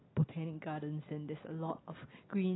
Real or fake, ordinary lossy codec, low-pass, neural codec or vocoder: real; AAC, 16 kbps; 7.2 kHz; none